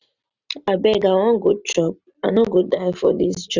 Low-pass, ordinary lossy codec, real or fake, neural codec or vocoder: 7.2 kHz; none; real; none